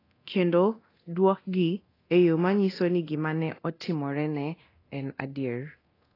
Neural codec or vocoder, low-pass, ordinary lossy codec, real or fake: codec, 24 kHz, 0.9 kbps, DualCodec; 5.4 kHz; AAC, 24 kbps; fake